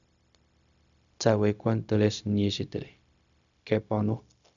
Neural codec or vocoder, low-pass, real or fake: codec, 16 kHz, 0.4 kbps, LongCat-Audio-Codec; 7.2 kHz; fake